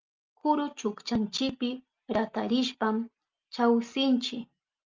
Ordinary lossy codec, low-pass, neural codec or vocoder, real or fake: Opus, 24 kbps; 7.2 kHz; none; real